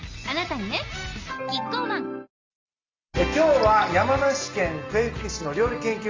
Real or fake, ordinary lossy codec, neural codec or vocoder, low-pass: real; Opus, 32 kbps; none; 7.2 kHz